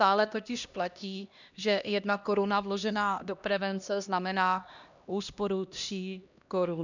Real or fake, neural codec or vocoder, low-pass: fake; codec, 16 kHz, 1 kbps, X-Codec, HuBERT features, trained on LibriSpeech; 7.2 kHz